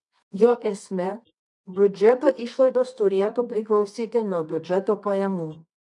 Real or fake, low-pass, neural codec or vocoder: fake; 10.8 kHz; codec, 24 kHz, 0.9 kbps, WavTokenizer, medium music audio release